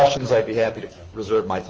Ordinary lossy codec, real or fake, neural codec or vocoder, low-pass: Opus, 24 kbps; fake; codec, 24 kHz, 6 kbps, HILCodec; 7.2 kHz